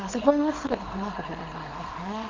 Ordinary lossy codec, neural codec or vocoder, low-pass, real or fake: Opus, 32 kbps; codec, 24 kHz, 0.9 kbps, WavTokenizer, small release; 7.2 kHz; fake